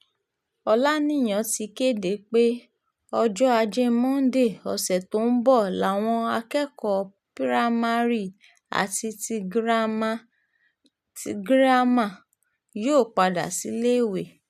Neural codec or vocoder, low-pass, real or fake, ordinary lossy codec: none; 14.4 kHz; real; none